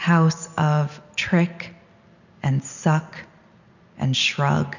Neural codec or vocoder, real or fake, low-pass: none; real; 7.2 kHz